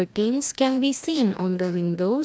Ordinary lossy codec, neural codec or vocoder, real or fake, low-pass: none; codec, 16 kHz, 1 kbps, FreqCodec, larger model; fake; none